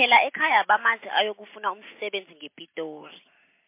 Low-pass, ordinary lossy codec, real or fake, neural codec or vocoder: 3.6 kHz; MP3, 24 kbps; real; none